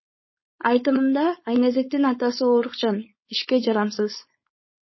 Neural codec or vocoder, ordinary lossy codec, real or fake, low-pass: codec, 16 kHz, 4.8 kbps, FACodec; MP3, 24 kbps; fake; 7.2 kHz